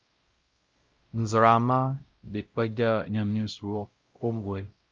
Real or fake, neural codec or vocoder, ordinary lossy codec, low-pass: fake; codec, 16 kHz, 0.5 kbps, X-Codec, WavLM features, trained on Multilingual LibriSpeech; Opus, 24 kbps; 7.2 kHz